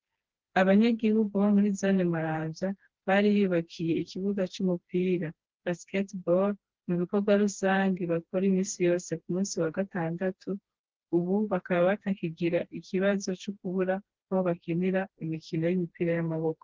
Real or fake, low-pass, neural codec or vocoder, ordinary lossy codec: fake; 7.2 kHz; codec, 16 kHz, 2 kbps, FreqCodec, smaller model; Opus, 16 kbps